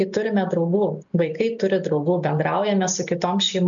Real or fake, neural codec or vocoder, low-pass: real; none; 7.2 kHz